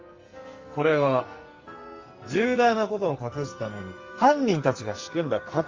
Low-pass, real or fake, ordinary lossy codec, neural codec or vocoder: 7.2 kHz; fake; Opus, 32 kbps; codec, 44.1 kHz, 2.6 kbps, SNAC